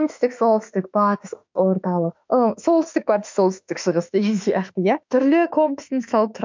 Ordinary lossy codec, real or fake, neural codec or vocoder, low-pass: MP3, 64 kbps; fake; autoencoder, 48 kHz, 32 numbers a frame, DAC-VAE, trained on Japanese speech; 7.2 kHz